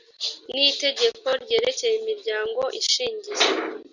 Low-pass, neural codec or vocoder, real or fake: 7.2 kHz; none; real